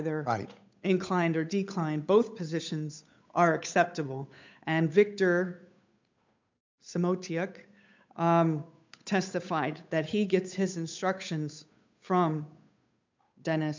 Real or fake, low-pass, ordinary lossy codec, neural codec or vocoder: fake; 7.2 kHz; MP3, 64 kbps; codec, 16 kHz, 8 kbps, FunCodec, trained on Chinese and English, 25 frames a second